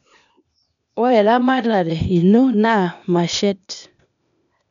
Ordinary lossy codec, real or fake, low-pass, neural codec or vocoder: none; fake; 7.2 kHz; codec, 16 kHz, 0.8 kbps, ZipCodec